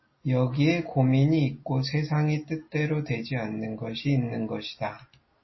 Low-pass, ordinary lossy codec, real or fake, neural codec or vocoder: 7.2 kHz; MP3, 24 kbps; real; none